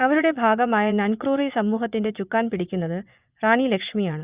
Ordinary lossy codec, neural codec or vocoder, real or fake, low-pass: Opus, 64 kbps; vocoder, 24 kHz, 100 mel bands, Vocos; fake; 3.6 kHz